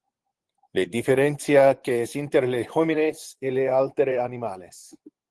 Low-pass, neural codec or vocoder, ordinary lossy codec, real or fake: 10.8 kHz; vocoder, 24 kHz, 100 mel bands, Vocos; Opus, 16 kbps; fake